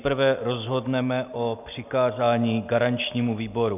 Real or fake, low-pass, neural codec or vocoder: real; 3.6 kHz; none